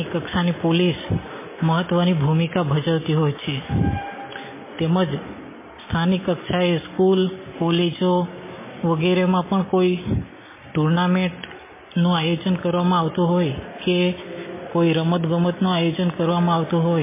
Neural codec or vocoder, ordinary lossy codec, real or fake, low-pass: none; MP3, 16 kbps; real; 3.6 kHz